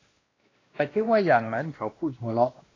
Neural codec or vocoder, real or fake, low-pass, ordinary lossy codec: codec, 16 kHz, 1 kbps, X-Codec, WavLM features, trained on Multilingual LibriSpeech; fake; 7.2 kHz; AAC, 32 kbps